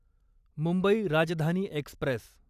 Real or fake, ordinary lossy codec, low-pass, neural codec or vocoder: fake; none; 14.4 kHz; vocoder, 44.1 kHz, 128 mel bands every 512 samples, BigVGAN v2